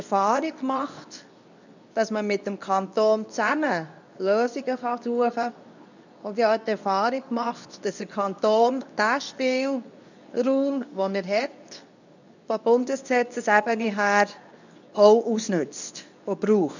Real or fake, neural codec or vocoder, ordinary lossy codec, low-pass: fake; codec, 24 kHz, 0.9 kbps, WavTokenizer, medium speech release version 2; none; 7.2 kHz